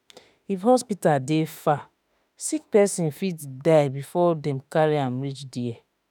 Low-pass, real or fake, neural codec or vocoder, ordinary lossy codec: none; fake; autoencoder, 48 kHz, 32 numbers a frame, DAC-VAE, trained on Japanese speech; none